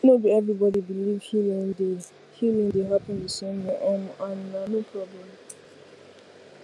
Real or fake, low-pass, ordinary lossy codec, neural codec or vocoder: real; none; none; none